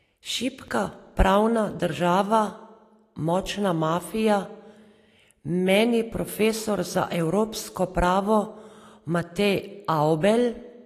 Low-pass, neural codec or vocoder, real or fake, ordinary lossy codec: 14.4 kHz; none; real; AAC, 48 kbps